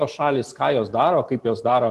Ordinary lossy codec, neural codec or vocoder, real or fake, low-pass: Opus, 24 kbps; none; real; 14.4 kHz